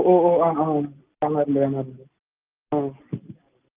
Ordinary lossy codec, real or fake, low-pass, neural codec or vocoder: Opus, 32 kbps; real; 3.6 kHz; none